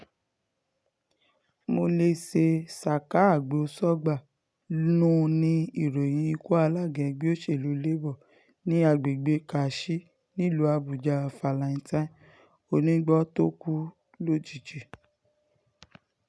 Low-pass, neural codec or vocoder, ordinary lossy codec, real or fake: none; none; none; real